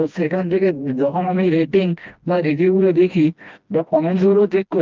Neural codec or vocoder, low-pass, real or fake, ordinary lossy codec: codec, 16 kHz, 1 kbps, FreqCodec, smaller model; 7.2 kHz; fake; Opus, 32 kbps